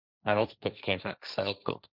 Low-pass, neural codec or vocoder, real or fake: 5.4 kHz; codec, 16 kHz, 1.1 kbps, Voila-Tokenizer; fake